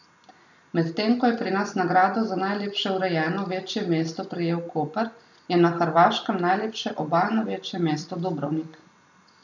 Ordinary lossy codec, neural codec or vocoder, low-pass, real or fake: none; none; 7.2 kHz; real